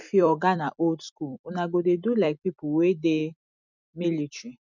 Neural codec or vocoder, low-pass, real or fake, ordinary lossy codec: vocoder, 44.1 kHz, 128 mel bands every 256 samples, BigVGAN v2; 7.2 kHz; fake; none